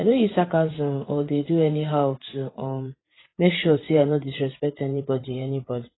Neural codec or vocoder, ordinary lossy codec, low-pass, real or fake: vocoder, 44.1 kHz, 80 mel bands, Vocos; AAC, 16 kbps; 7.2 kHz; fake